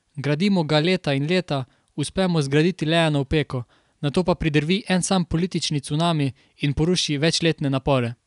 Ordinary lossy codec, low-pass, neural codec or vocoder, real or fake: none; 10.8 kHz; none; real